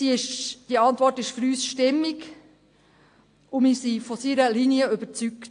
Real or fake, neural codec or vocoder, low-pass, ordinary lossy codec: real; none; 9.9 kHz; AAC, 48 kbps